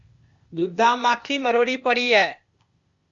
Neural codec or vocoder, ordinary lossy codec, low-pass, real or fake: codec, 16 kHz, 0.8 kbps, ZipCodec; Opus, 64 kbps; 7.2 kHz; fake